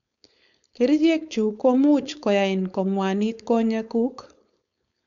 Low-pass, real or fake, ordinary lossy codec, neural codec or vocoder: 7.2 kHz; fake; none; codec, 16 kHz, 4.8 kbps, FACodec